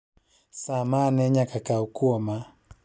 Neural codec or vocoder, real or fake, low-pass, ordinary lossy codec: none; real; none; none